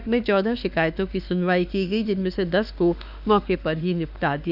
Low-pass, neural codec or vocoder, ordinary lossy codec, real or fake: 5.4 kHz; autoencoder, 48 kHz, 32 numbers a frame, DAC-VAE, trained on Japanese speech; none; fake